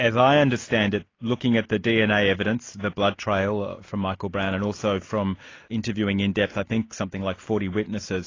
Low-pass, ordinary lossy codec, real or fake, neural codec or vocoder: 7.2 kHz; AAC, 32 kbps; real; none